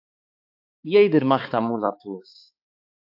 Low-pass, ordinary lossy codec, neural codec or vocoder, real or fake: 5.4 kHz; AAC, 48 kbps; codec, 16 kHz, 4 kbps, X-Codec, HuBERT features, trained on balanced general audio; fake